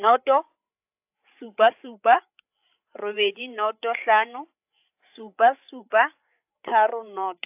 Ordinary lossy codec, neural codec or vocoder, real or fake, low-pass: AAC, 32 kbps; codec, 16 kHz, 8 kbps, FreqCodec, larger model; fake; 3.6 kHz